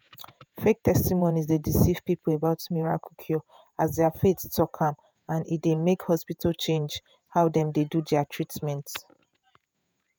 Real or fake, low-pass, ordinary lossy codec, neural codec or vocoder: fake; none; none; vocoder, 48 kHz, 128 mel bands, Vocos